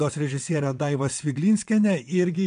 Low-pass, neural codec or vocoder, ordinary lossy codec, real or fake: 9.9 kHz; vocoder, 22.05 kHz, 80 mel bands, WaveNeXt; MP3, 64 kbps; fake